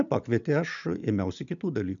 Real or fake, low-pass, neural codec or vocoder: real; 7.2 kHz; none